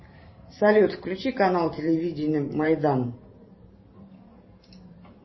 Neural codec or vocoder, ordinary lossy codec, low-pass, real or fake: vocoder, 44.1 kHz, 128 mel bands every 512 samples, BigVGAN v2; MP3, 24 kbps; 7.2 kHz; fake